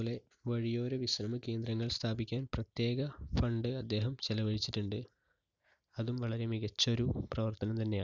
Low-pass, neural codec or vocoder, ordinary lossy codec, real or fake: 7.2 kHz; none; none; real